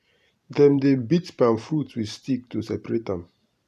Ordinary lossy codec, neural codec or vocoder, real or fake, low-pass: none; vocoder, 44.1 kHz, 128 mel bands every 512 samples, BigVGAN v2; fake; 14.4 kHz